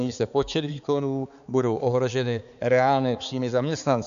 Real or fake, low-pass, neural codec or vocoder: fake; 7.2 kHz; codec, 16 kHz, 4 kbps, X-Codec, HuBERT features, trained on balanced general audio